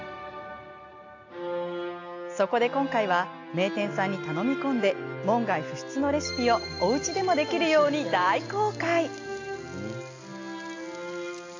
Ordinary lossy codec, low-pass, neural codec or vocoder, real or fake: AAC, 48 kbps; 7.2 kHz; none; real